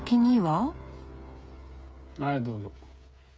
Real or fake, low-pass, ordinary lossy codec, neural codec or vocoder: fake; none; none; codec, 16 kHz, 8 kbps, FreqCodec, smaller model